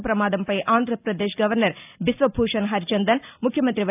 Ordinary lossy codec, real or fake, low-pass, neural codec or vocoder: AAC, 32 kbps; real; 3.6 kHz; none